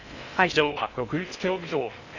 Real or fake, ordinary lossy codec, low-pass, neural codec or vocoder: fake; none; 7.2 kHz; codec, 16 kHz in and 24 kHz out, 0.6 kbps, FocalCodec, streaming, 2048 codes